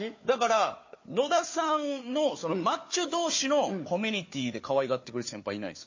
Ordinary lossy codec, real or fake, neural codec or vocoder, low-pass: MP3, 32 kbps; fake; codec, 16 kHz, 4 kbps, FunCodec, trained on Chinese and English, 50 frames a second; 7.2 kHz